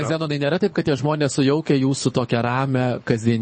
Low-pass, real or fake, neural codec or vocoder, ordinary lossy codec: 9.9 kHz; fake; codec, 24 kHz, 6 kbps, HILCodec; MP3, 32 kbps